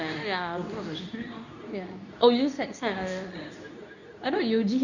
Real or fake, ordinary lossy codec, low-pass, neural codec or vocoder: fake; none; 7.2 kHz; codec, 24 kHz, 0.9 kbps, WavTokenizer, medium speech release version 2